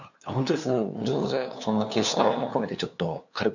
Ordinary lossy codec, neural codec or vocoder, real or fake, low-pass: none; codec, 16 kHz, 2 kbps, FunCodec, trained on LibriTTS, 25 frames a second; fake; 7.2 kHz